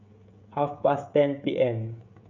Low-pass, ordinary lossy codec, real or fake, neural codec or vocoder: 7.2 kHz; none; fake; codec, 16 kHz, 16 kbps, FreqCodec, smaller model